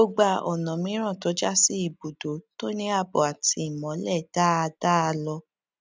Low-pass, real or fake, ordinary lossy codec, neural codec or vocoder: none; real; none; none